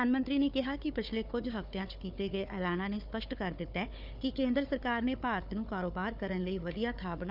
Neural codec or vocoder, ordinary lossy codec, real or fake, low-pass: codec, 16 kHz, 4 kbps, FunCodec, trained on Chinese and English, 50 frames a second; none; fake; 5.4 kHz